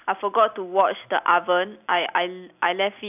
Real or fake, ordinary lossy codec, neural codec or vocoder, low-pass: real; none; none; 3.6 kHz